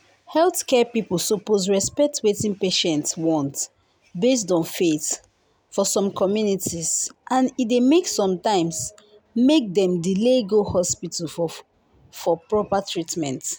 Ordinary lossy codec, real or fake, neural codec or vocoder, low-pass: none; real; none; none